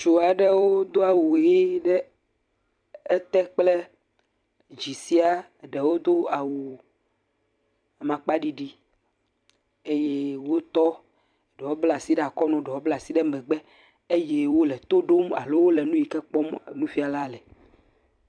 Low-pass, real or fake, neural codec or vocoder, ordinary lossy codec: 9.9 kHz; fake; vocoder, 44.1 kHz, 128 mel bands every 256 samples, BigVGAN v2; MP3, 96 kbps